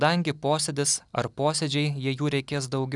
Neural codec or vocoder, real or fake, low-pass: none; real; 10.8 kHz